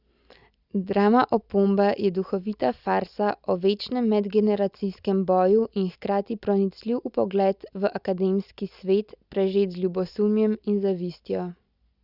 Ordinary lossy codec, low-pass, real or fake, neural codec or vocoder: Opus, 64 kbps; 5.4 kHz; real; none